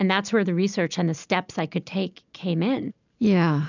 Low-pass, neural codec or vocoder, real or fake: 7.2 kHz; none; real